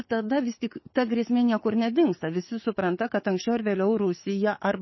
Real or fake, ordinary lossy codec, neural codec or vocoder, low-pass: fake; MP3, 24 kbps; codec, 44.1 kHz, 7.8 kbps, DAC; 7.2 kHz